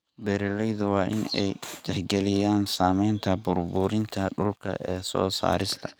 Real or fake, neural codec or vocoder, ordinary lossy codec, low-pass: fake; codec, 44.1 kHz, 7.8 kbps, DAC; none; none